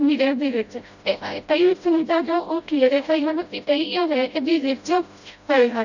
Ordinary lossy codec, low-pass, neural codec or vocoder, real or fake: none; 7.2 kHz; codec, 16 kHz, 0.5 kbps, FreqCodec, smaller model; fake